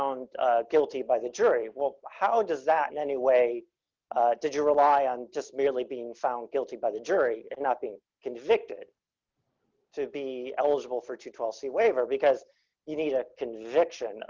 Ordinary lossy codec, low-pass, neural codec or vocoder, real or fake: Opus, 32 kbps; 7.2 kHz; none; real